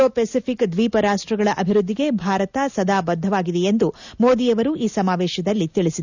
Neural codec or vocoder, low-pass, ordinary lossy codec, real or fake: none; 7.2 kHz; none; real